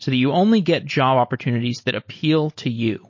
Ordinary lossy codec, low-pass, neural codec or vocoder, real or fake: MP3, 32 kbps; 7.2 kHz; none; real